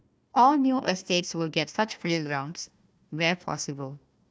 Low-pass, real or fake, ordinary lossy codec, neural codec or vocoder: none; fake; none; codec, 16 kHz, 1 kbps, FunCodec, trained on Chinese and English, 50 frames a second